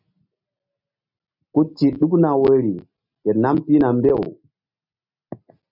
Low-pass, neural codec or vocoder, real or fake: 5.4 kHz; none; real